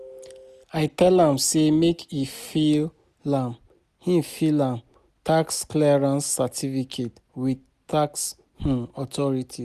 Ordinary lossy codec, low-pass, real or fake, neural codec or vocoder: none; 14.4 kHz; real; none